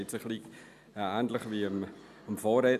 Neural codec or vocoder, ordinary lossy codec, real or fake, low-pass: none; none; real; 14.4 kHz